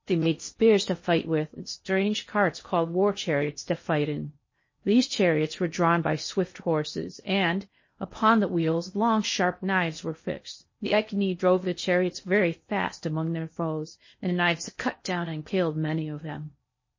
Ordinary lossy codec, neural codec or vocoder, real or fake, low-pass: MP3, 32 kbps; codec, 16 kHz in and 24 kHz out, 0.6 kbps, FocalCodec, streaming, 4096 codes; fake; 7.2 kHz